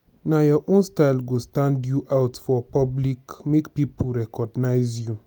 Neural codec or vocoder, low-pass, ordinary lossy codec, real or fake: none; none; none; real